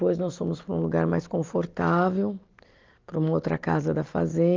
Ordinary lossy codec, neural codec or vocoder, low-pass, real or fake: Opus, 24 kbps; none; 7.2 kHz; real